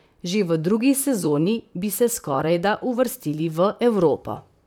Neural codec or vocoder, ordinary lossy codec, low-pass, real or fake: vocoder, 44.1 kHz, 128 mel bands, Pupu-Vocoder; none; none; fake